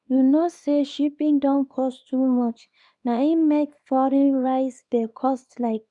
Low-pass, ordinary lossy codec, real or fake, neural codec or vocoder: 10.8 kHz; none; fake; codec, 24 kHz, 0.9 kbps, WavTokenizer, small release